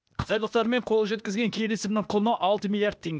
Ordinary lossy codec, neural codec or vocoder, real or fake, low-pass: none; codec, 16 kHz, 0.8 kbps, ZipCodec; fake; none